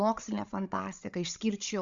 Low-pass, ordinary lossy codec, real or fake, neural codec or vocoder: 7.2 kHz; Opus, 64 kbps; fake; codec, 16 kHz, 16 kbps, FreqCodec, larger model